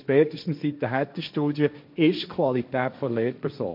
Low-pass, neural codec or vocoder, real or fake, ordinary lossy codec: 5.4 kHz; codec, 16 kHz, 1.1 kbps, Voila-Tokenizer; fake; MP3, 48 kbps